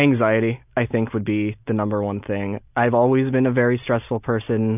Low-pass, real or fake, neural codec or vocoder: 3.6 kHz; real; none